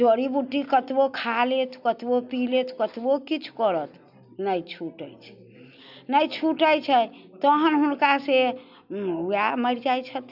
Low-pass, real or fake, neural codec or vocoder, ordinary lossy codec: 5.4 kHz; real; none; none